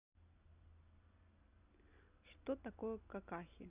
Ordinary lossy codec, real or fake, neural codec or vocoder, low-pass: Opus, 64 kbps; real; none; 3.6 kHz